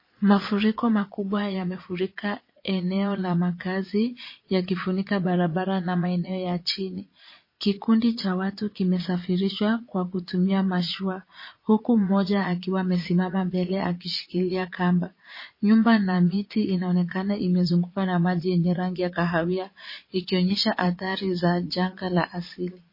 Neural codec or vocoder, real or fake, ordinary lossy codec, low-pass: vocoder, 22.05 kHz, 80 mel bands, Vocos; fake; MP3, 24 kbps; 5.4 kHz